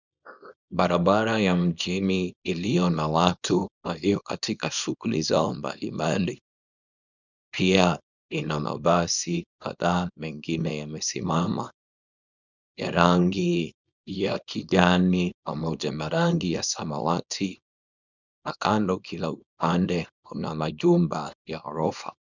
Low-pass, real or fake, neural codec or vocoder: 7.2 kHz; fake; codec, 24 kHz, 0.9 kbps, WavTokenizer, small release